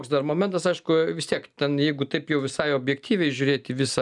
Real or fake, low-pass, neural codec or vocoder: real; 10.8 kHz; none